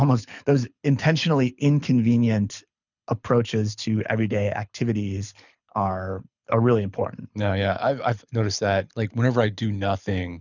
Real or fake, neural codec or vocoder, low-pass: fake; codec, 24 kHz, 6 kbps, HILCodec; 7.2 kHz